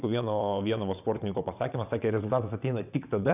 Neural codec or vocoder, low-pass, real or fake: vocoder, 22.05 kHz, 80 mel bands, WaveNeXt; 3.6 kHz; fake